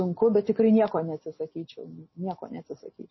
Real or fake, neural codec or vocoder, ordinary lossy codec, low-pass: real; none; MP3, 24 kbps; 7.2 kHz